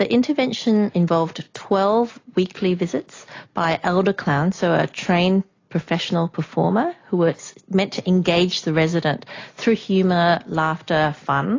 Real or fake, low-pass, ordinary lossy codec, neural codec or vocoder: real; 7.2 kHz; AAC, 32 kbps; none